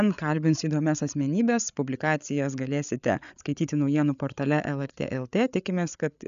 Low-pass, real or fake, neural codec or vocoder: 7.2 kHz; fake; codec, 16 kHz, 8 kbps, FreqCodec, larger model